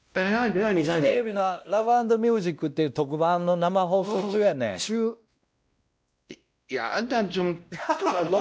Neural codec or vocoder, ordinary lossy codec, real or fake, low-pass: codec, 16 kHz, 1 kbps, X-Codec, WavLM features, trained on Multilingual LibriSpeech; none; fake; none